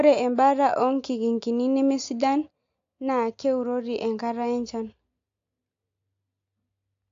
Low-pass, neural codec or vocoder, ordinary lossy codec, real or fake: 7.2 kHz; none; AAC, 48 kbps; real